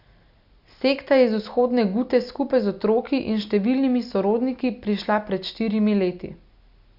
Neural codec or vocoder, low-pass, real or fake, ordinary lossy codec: none; 5.4 kHz; real; Opus, 64 kbps